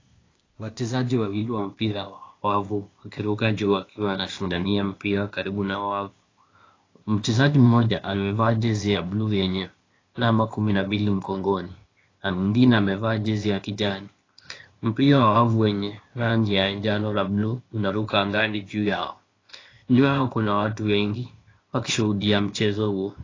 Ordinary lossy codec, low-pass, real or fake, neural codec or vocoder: AAC, 32 kbps; 7.2 kHz; fake; codec, 16 kHz, 0.8 kbps, ZipCodec